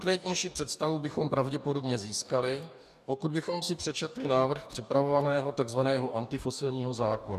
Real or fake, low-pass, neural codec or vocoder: fake; 14.4 kHz; codec, 44.1 kHz, 2.6 kbps, DAC